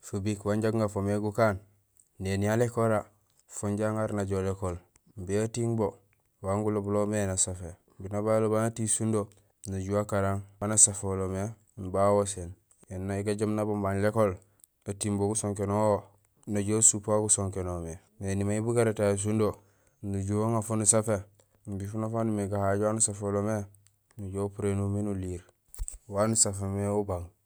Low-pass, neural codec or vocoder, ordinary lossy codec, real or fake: none; none; none; real